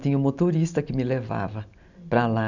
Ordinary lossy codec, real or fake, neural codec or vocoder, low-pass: none; real; none; 7.2 kHz